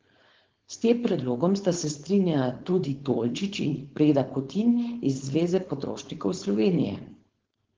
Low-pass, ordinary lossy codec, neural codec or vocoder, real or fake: 7.2 kHz; Opus, 16 kbps; codec, 16 kHz, 4.8 kbps, FACodec; fake